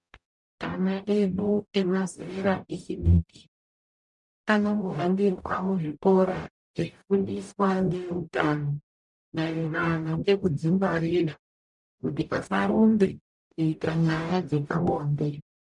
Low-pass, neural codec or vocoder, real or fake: 10.8 kHz; codec, 44.1 kHz, 0.9 kbps, DAC; fake